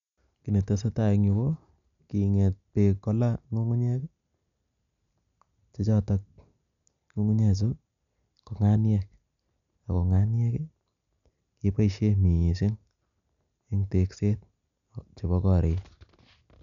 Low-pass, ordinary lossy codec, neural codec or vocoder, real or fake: 7.2 kHz; none; none; real